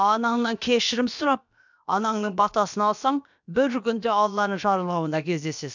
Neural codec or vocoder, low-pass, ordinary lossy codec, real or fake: codec, 16 kHz, about 1 kbps, DyCAST, with the encoder's durations; 7.2 kHz; none; fake